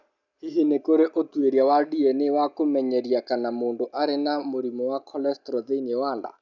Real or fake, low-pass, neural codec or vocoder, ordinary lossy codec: real; 7.2 kHz; none; none